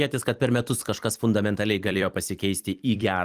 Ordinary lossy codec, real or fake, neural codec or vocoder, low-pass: Opus, 24 kbps; fake; vocoder, 44.1 kHz, 128 mel bands every 256 samples, BigVGAN v2; 14.4 kHz